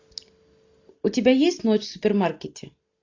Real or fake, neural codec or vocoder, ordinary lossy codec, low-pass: real; none; AAC, 48 kbps; 7.2 kHz